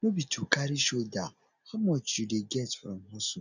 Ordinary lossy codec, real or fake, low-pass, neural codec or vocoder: none; real; 7.2 kHz; none